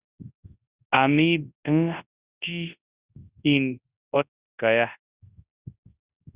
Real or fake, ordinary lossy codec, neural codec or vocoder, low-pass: fake; Opus, 64 kbps; codec, 24 kHz, 0.9 kbps, WavTokenizer, large speech release; 3.6 kHz